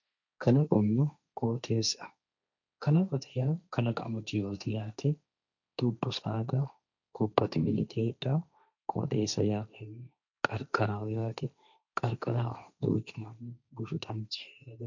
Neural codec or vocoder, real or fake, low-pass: codec, 16 kHz, 1.1 kbps, Voila-Tokenizer; fake; 7.2 kHz